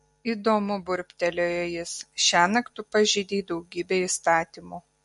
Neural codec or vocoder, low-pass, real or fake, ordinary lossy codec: none; 14.4 kHz; real; MP3, 48 kbps